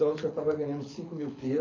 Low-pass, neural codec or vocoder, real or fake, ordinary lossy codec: 7.2 kHz; codec, 24 kHz, 6 kbps, HILCodec; fake; AAC, 32 kbps